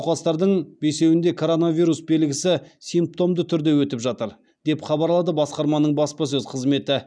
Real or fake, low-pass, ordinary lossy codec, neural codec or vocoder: real; 9.9 kHz; none; none